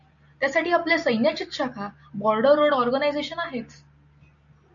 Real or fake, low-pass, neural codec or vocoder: real; 7.2 kHz; none